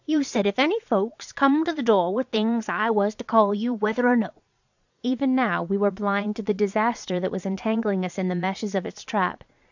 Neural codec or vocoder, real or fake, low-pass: vocoder, 22.05 kHz, 80 mel bands, Vocos; fake; 7.2 kHz